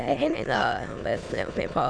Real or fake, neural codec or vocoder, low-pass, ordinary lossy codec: fake; autoencoder, 22.05 kHz, a latent of 192 numbers a frame, VITS, trained on many speakers; 9.9 kHz; none